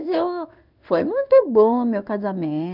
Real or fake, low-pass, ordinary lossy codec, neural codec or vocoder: fake; 5.4 kHz; none; codec, 16 kHz in and 24 kHz out, 1 kbps, XY-Tokenizer